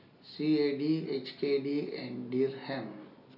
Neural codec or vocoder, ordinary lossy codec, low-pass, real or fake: none; none; 5.4 kHz; real